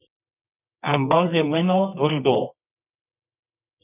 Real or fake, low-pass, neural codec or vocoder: fake; 3.6 kHz; codec, 24 kHz, 0.9 kbps, WavTokenizer, medium music audio release